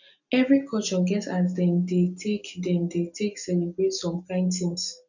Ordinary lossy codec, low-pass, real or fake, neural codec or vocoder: none; 7.2 kHz; real; none